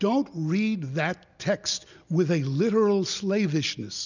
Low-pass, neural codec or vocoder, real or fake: 7.2 kHz; none; real